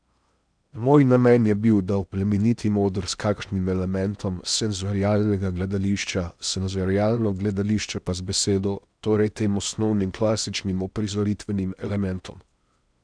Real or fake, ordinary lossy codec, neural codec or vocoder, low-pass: fake; none; codec, 16 kHz in and 24 kHz out, 0.8 kbps, FocalCodec, streaming, 65536 codes; 9.9 kHz